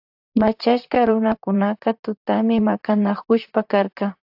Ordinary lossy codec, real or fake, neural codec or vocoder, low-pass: AAC, 48 kbps; fake; vocoder, 44.1 kHz, 128 mel bands, Pupu-Vocoder; 5.4 kHz